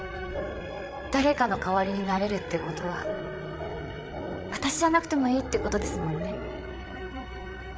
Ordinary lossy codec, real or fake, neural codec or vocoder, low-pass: none; fake; codec, 16 kHz, 8 kbps, FreqCodec, larger model; none